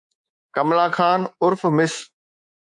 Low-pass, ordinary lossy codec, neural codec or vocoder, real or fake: 10.8 kHz; MP3, 64 kbps; codec, 24 kHz, 3.1 kbps, DualCodec; fake